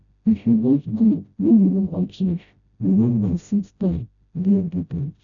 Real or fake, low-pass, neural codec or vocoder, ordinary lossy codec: fake; 7.2 kHz; codec, 16 kHz, 0.5 kbps, FreqCodec, smaller model; none